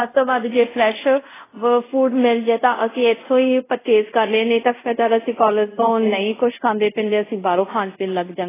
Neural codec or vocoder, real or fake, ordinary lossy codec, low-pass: codec, 24 kHz, 0.5 kbps, DualCodec; fake; AAC, 16 kbps; 3.6 kHz